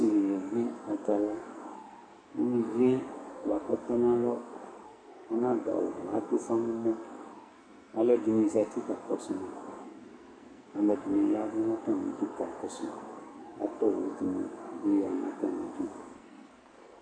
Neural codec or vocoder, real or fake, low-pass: codec, 44.1 kHz, 2.6 kbps, SNAC; fake; 9.9 kHz